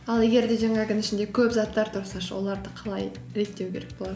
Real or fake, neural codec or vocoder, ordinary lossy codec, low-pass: real; none; none; none